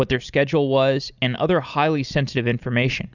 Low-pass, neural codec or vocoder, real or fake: 7.2 kHz; none; real